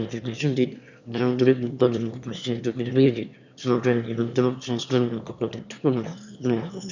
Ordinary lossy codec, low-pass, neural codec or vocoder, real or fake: none; 7.2 kHz; autoencoder, 22.05 kHz, a latent of 192 numbers a frame, VITS, trained on one speaker; fake